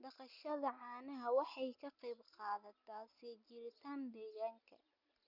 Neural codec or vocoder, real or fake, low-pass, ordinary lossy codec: none; real; 5.4 kHz; none